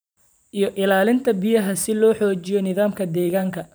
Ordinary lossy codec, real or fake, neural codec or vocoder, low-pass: none; fake; vocoder, 44.1 kHz, 128 mel bands every 512 samples, BigVGAN v2; none